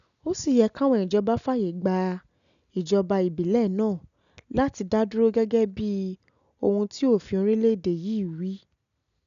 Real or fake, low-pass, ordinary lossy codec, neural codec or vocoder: real; 7.2 kHz; none; none